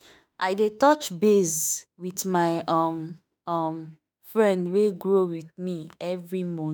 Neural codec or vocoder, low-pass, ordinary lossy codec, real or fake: autoencoder, 48 kHz, 32 numbers a frame, DAC-VAE, trained on Japanese speech; none; none; fake